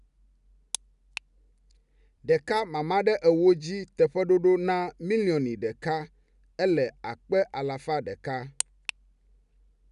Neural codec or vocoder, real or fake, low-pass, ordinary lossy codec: none; real; 10.8 kHz; none